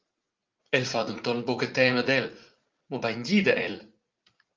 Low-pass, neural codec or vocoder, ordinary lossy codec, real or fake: 7.2 kHz; vocoder, 44.1 kHz, 80 mel bands, Vocos; Opus, 32 kbps; fake